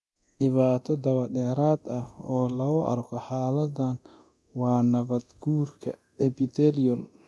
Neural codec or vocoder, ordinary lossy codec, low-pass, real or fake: codec, 24 kHz, 0.9 kbps, DualCodec; none; none; fake